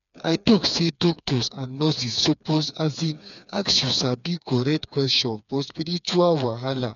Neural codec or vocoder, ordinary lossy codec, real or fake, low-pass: codec, 16 kHz, 4 kbps, FreqCodec, smaller model; none; fake; 7.2 kHz